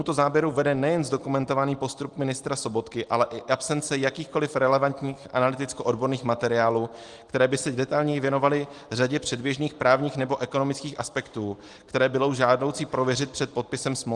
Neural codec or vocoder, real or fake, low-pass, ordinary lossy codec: none; real; 10.8 kHz; Opus, 24 kbps